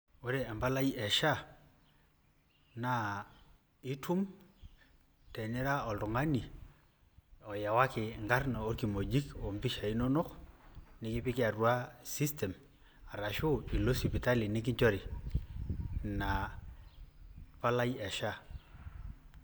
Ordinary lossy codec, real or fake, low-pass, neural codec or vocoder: none; real; none; none